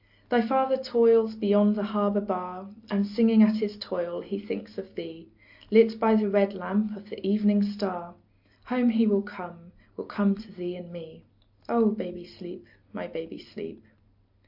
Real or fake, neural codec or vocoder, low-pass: real; none; 5.4 kHz